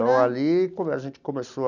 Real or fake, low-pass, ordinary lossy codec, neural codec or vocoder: real; 7.2 kHz; none; none